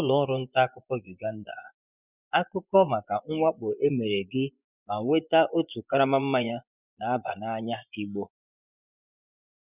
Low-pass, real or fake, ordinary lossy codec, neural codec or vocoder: 3.6 kHz; fake; none; vocoder, 24 kHz, 100 mel bands, Vocos